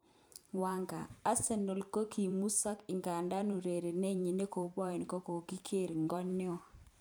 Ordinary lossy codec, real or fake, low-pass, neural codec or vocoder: none; fake; none; vocoder, 44.1 kHz, 128 mel bands every 512 samples, BigVGAN v2